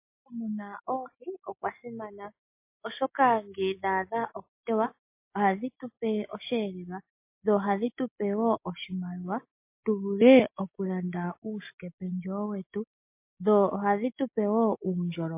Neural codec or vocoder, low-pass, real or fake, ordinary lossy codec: none; 3.6 kHz; real; MP3, 24 kbps